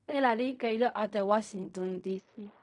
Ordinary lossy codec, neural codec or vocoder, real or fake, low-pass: none; codec, 16 kHz in and 24 kHz out, 0.4 kbps, LongCat-Audio-Codec, fine tuned four codebook decoder; fake; 10.8 kHz